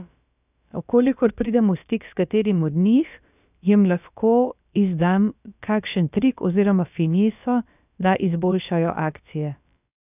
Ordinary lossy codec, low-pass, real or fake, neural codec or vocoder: none; 3.6 kHz; fake; codec, 16 kHz, about 1 kbps, DyCAST, with the encoder's durations